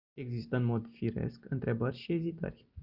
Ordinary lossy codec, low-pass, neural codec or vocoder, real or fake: Opus, 64 kbps; 5.4 kHz; none; real